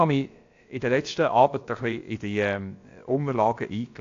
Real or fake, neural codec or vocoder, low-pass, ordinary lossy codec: fake; codec, 16 kHz, about 1 kbps, DyCAST, with the encoder's durations; 7.2 kHz; AAC, 48 kbps